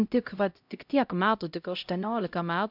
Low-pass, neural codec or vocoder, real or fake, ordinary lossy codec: 5.4 kHz; codec, 16 kHz, 0.5 kbps, X-Codec, HuBERT features, trained on LibriSpeech; fake; AAC, 48 kbps